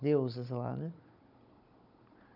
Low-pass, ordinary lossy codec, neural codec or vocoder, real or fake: 5.4 kHz; none; codec, 16 kHz, 16 kbps, FunCodec, trained on Chinese and English, 50 frames a second; fake